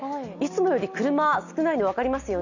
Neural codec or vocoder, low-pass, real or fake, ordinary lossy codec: none; 7.2 kHz; real; none